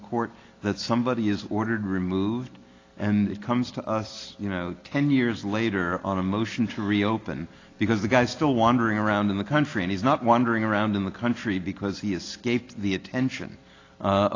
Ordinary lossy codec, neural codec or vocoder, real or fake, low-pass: AAC, 32 kbps; none; real; 7.2 kHz